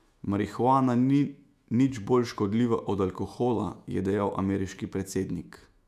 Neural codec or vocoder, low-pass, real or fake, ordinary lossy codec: autoencoder, 48 kHz, 128 numbers a frame, DAC-VAE, trained on Japanese speech; 14.4 kHz; fake; none